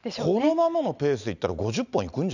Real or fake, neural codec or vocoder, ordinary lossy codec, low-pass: real; none; none; 7.2 kHz